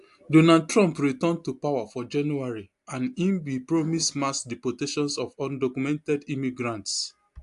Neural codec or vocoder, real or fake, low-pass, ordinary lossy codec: none; real; 10.8 kHz; AAC, 64 kbps